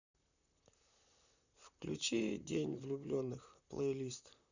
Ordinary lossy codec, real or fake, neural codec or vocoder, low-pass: none; fake; vocoder, 44.1 kHz, 128 mel bands, Pupu-Vocoder; 7.2 kHz